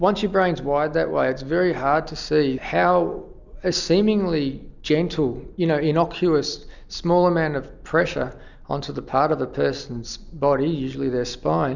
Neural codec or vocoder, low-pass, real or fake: none; 7.2 kHz; real